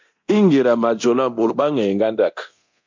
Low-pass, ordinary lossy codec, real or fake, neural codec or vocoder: 7.2 kHz; MP3, 64 kbps; fake; codec, 24 kHz, 0.9 kbps, DualCodec